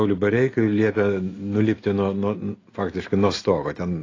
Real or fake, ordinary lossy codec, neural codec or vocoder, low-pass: real; AAC, 32 kbps; none; 7.2 kHz